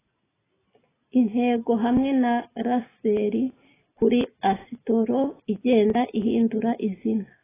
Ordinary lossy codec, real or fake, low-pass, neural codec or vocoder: AAC, 16 kbps; real; 3.6 kHz; none